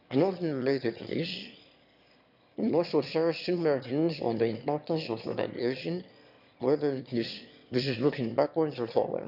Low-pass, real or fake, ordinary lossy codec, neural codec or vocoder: 5.4 kHz; fake; none; autoencoder, 22.05 kHz, a latent of 192 numbers a frame, VITS, trained on one speaker